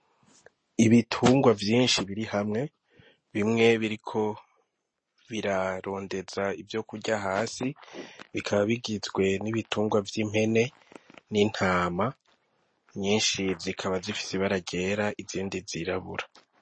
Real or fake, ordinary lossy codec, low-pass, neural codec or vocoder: real; MP3, 32 kbps; 9.9 kHz; none